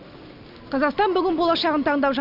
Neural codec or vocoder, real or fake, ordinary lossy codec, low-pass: none; real; none; 5.4 kHz